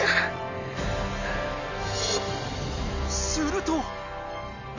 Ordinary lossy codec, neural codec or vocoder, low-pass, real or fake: none; none; 7.2 kHz; real